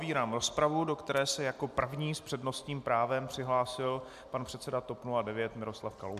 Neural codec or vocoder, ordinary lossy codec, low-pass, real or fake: none; MP3, 96 kbps; 14.4 kHz; real